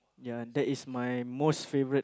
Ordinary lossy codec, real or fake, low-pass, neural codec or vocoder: none; real; none; none